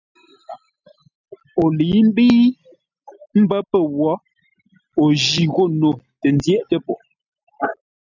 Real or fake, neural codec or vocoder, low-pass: real; none; 7.2 kHz